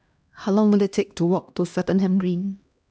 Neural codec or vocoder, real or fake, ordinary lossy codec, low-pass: codec, 16 kHz, 1 kbps, X-Codec, HuBERT features, trained on LibriSpeech; fake; none; none